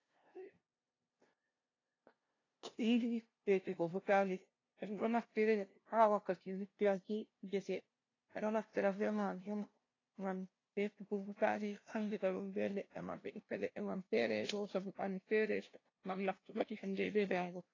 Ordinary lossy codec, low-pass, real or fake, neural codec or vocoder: AAC, 32 kbps; 7.2 kHz; fake; codec, 16 kHz, 0.5 kbps, FunCodec, trained on LibriTTS, 25 frames a second